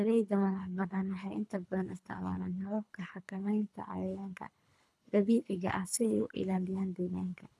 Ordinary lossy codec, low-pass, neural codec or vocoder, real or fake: none; none; codec, 24 kHz, 3 kbps, HILCodec; fake